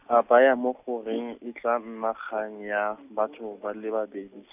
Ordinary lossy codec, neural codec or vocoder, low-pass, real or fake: none; none; 3.6 kHz; real